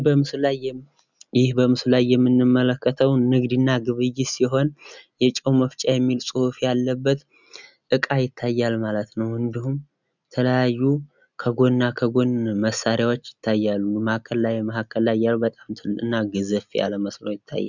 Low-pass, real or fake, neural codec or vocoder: 7.2 kHz; real; none